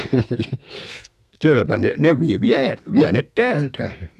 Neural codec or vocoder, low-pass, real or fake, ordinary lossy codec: codec, 32 kHz, 1.9 kbps, SNAC; 14.4 kHz; fake; none